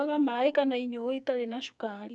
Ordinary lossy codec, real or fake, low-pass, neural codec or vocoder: none; fake; 10.8 kHz; codec, 44.1 kHz, 2.6 kbps, SNAC